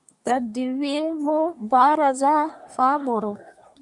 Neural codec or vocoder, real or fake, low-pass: codec, 24 kHz, 1 kbps, SNAC; fake; 10.8 kHz